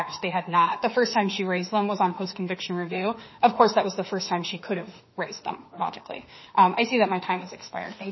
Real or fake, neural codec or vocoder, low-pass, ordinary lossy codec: fake; autoencoder, 48 kHz, 32 numbers a frame, DAC-VAE, trained on Japanese speech; 7.2 kHz; MP3, 24 kbps